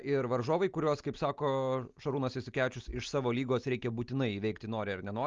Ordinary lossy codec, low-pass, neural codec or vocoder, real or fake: Opus, 32 kbps; 7.2 kHz; none; real